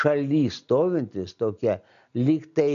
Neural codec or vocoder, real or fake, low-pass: none; real; 7.2 kHz